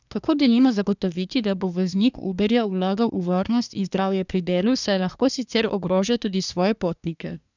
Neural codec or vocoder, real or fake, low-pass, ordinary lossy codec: codec, 24 kHz, 1 kbps, SNAC; fake; 7.2 kHz; none